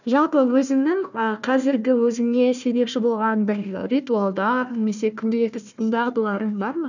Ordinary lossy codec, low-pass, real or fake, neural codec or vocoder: none; 7.2 kHz; fake; codec, 16 kHz, 1 kbps, FunCodec, trained on Chinese and English, 50 frames a second